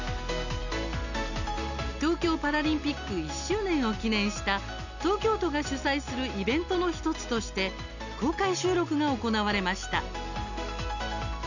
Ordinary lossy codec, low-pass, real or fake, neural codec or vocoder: none; 7.2 kHz; real; none